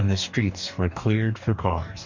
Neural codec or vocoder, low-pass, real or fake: codec, 44.1 kHz, 2.6 kbps, DAC; 7.2 kHz; fake